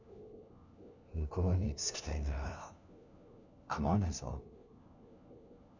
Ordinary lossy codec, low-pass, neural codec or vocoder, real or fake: none; 7.2 kHz; codec, 16 kHz, 1 kbps, FunCodec, trained on LibriTTS, 50 frames a second; fake